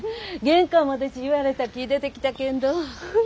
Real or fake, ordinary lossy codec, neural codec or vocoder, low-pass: real; none; none; none